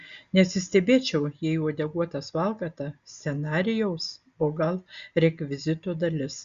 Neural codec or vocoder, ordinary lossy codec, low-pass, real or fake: none; Opus, 64 kbps; 7.2 kHz; real